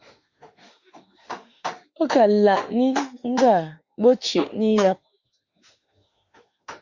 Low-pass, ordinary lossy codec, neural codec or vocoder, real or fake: 7.2 kHz; Opus, 64 kbps; autoencoder, 48 kHz, 32 numbers a frame, DAC-VAE, trained on Japanese speech; fake